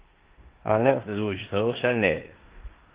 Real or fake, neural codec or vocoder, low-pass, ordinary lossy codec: fake; codec, 16 kHz in and 24 kHz out, 0.9 kbps, LongCat-Audio-Codec, four codebook decoder; 3.6 kHz; Opus, 16 kbps